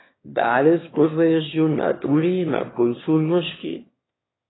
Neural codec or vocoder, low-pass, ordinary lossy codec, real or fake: autoencoder, 22.05 kHz, a latent of 192 numbers a frame, VITS, trained on one speaker; 7.2 kHz; AAC, 16 kbps; fake